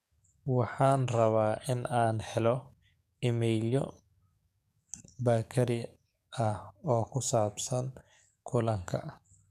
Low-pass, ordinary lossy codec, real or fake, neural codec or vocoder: 14.4 kHz; none; fake; codec, 44.1 kHz, 7.8 kbps, DAC